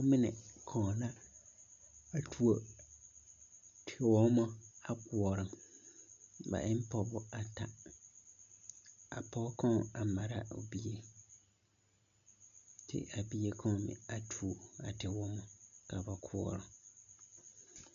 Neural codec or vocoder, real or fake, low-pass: none; real; 7.2 kHz